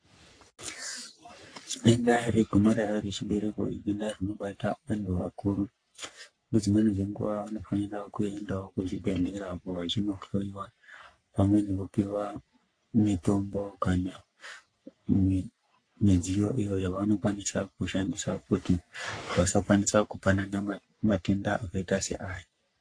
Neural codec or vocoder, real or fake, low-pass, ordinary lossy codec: codec, 44.1 kHz, 3.4 kbps, Pupu-Codec; fake; 9.9 kHz; AAC, 48 kbps